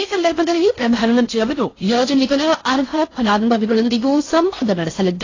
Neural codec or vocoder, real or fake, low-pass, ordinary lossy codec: codec, 16 kHz in and 24 kHz out, 0.6 kbps, FocalCodec, streaming, 4096 codes; fake; 7.2 kHz; AAC, 32 kbps